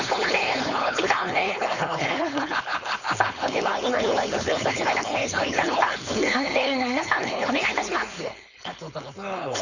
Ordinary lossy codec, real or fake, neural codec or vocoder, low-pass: none; fake; codec, 16 kHz, 4.8 kbps, FACodec; 7.2 kHz